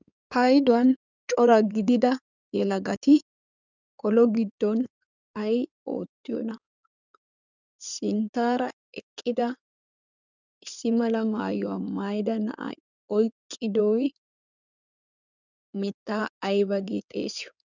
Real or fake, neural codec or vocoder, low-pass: fake; codec, 16 kHz in and 24 kHz out, 2.2 kbps, FireRedTTS-2 codec; 7.2 kHz